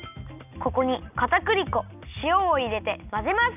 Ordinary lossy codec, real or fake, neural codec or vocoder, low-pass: none; real; none; 3.6 kHz